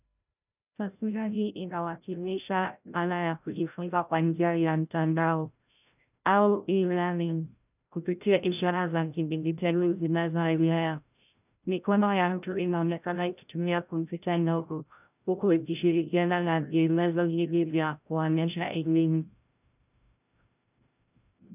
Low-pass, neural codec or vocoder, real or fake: 3.6 kHz; codec, 16 kHz, 0.5 kbps, FreqCodec, larger model; fake